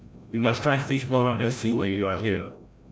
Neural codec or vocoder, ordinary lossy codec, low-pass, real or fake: codec, 16 kHz, 0.5 kbps, FreqCodec, larger model; none; none; fake